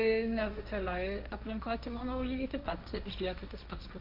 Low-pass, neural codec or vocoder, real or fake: 5.4 kHz; codec, 16 kHz, 1.1 kbps, Voila-Tokenizer; fake